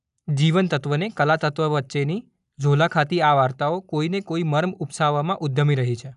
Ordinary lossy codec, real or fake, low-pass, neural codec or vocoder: none; real; 10.8 kHz; none